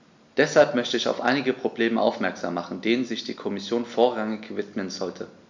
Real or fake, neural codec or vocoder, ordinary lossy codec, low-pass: real; none; MP3, 64 kbps; 7.2 kHz